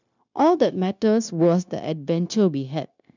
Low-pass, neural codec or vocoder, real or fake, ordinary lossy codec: 7.2 kHz; codec, 16 kHz, 0.9 kbps, LongCat-Audio-Codec; fake; none